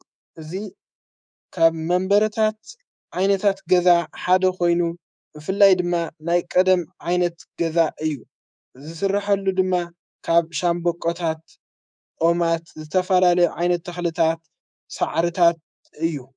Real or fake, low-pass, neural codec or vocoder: fake; 9.9 kHz; codec, 24 kHz, 3.1 kbps, DualCodec